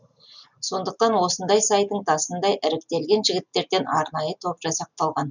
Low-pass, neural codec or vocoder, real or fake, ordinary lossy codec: 7.2 kHz; none; real; none